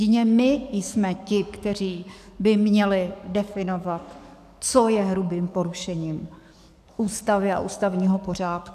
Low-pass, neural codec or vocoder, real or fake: 14.4 kHz; codec, 44.1 kHz, 7.8 kbps, DAC; fake